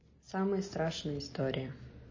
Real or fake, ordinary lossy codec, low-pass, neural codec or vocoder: real; MP3, 32 kbps; 7.2 kHz; none